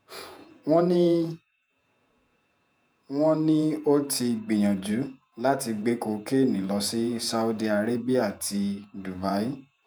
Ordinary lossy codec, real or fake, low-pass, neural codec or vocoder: none; fake; none; vocoder, 48 kHz, 128 mel bands, Vocos